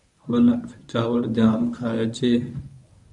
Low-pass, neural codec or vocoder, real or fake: 10.8 kHz; codec, 24 kHz, 0.9 kbps, WavTokenizer, medium speech release version 1; fake